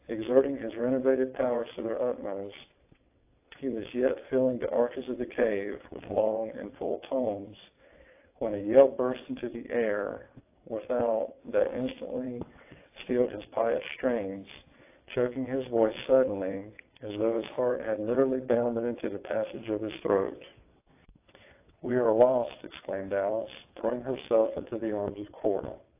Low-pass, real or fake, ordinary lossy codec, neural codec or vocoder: 3.6 kHz; fake; AAC, 24 kbps; vocoder, 22.05 kHz, 80 mel bands, WaveNeXt